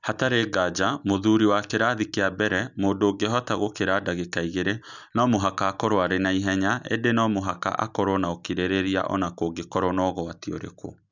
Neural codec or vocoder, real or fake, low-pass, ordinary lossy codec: none; real; 7.2 kHz; none